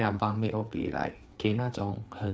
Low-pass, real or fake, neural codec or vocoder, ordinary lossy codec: none; fake; codec, 16 kHz, 4 kbps, FreqCodec, larger model; none